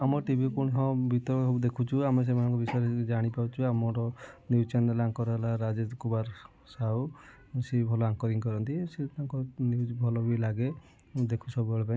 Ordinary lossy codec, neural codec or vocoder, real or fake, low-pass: none; none; real; none